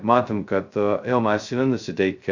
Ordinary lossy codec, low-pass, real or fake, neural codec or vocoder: Opus, 64 kbps; 7.2 kHz; fake; codec, 16 kHz, 0.2 kbps, FocalCodec